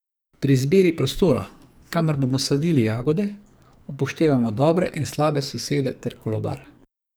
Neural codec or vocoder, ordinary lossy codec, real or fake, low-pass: codec, 44.1 kHz, 2.6 kbps, SNAC; none; fake; none